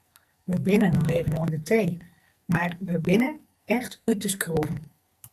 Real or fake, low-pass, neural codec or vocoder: fake; 14.4 kHz; codec, 44.1 kHz, 2.6 kbps, SNAC